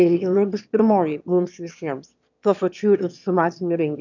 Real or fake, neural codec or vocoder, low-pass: fake; autoencoder, 22.05 kHz, a latent of 192 numbers a frame, VITS, trained on one speaker; 7.2 kHz